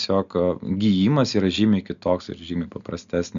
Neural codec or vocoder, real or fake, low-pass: none; real; 7.2 kHz